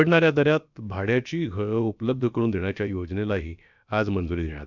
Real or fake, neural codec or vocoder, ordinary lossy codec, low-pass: fake; codec, 16 kHz, about 1 kbps, DyCAST, with the encoder's durations; none; 7.2 kHz